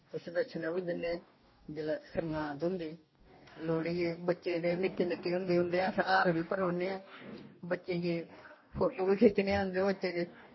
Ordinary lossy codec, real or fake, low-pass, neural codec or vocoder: MP3, 24 kbps; fake; 7.2 kHz; codec, 44.1 kHz, 2.6 kbps, DAC